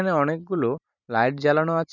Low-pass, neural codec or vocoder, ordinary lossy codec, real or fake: 7.2 kHz; vocoder, 44.1 kHz, 128 mel bands every 512 samples, BigVGAN v2; none; fake